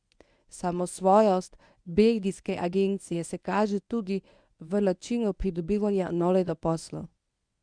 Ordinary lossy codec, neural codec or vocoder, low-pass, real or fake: Opus, 64 kbps; codec, 24 kHz, 0.9 kbps, WavTokenizer, medium speech release version 1; 9.9 kHz; fake